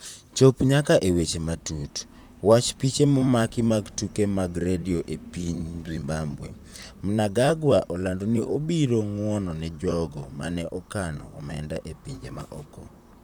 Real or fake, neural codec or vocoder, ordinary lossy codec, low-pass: fake; vocoder, 44.1 kHz, 128 mel bands, Pupu-Vocoder; none; none